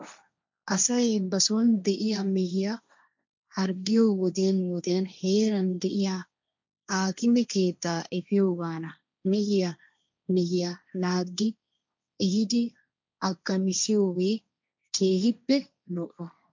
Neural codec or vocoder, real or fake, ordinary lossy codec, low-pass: codec, 16 kHz, 1.1 kbps, Voila-Tokenizer; fake; MP3, 64 kbps; 7.2 kHz